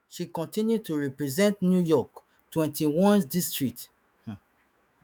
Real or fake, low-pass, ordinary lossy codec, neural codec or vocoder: fake; none; none; autoencoder, 48 kHz, 128 numbers a frame, DAC-VAE, trained on Japanese speech